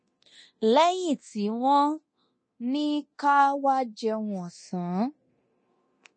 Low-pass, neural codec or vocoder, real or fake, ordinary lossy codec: 9.9 kHz; codec, 24 kHz, 0.9 kbps, DualCodec; fake; MP3, 32 kbps